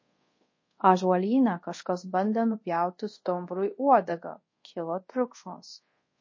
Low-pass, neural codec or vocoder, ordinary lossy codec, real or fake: 7.2 kHz; codec, 24 kHz, 0.5 kbps, DualCodec; MP3, 32 kbps; fake